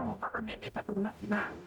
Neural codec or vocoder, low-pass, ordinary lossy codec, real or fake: codec, 44.1 kHz, 0.9 kbps, DAC; 19.8 kHz; none; fake